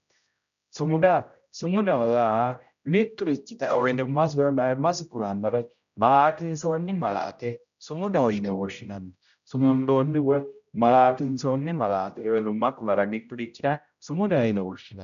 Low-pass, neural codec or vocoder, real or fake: 7.2 kHz; codec, 16 kHz, 0.5 kbps, X-Codec, HuBERT features, trained on general audio; fake